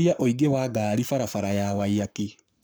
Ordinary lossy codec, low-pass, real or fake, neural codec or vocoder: none; none; fake; codec, 44.1 kHz, 7.8 kbps, Pupu-Codec